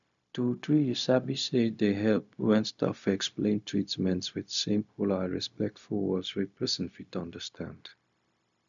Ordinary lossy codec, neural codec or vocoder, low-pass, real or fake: none; codec, 16 kHz, 0.4 kbps, LongCat-Audio-Codec; 7.2 kHz; fake